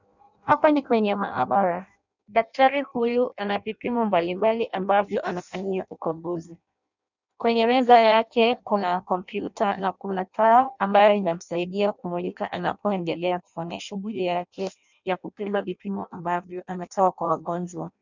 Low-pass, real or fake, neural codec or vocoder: 7.2 kHz; fake; codec, 16 kHz in and 24 kHz out, 0.6 kbps, FireRedTTS-2 codec